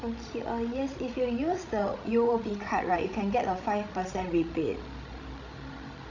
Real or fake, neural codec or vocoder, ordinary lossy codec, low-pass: fake; codec, 16 kHz, 16 kbps, FreqCodec, larger model; none; 7.2 kHz